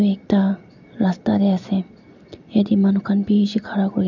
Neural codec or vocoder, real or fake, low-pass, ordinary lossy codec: none; real; 7.2 kHz; none